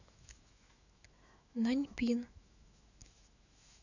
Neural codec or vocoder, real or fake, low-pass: autoencoder, 48 kHz, 128 numbers a frame, DAC-VAE, trained on Japanese speech; fake; 7.2 kHz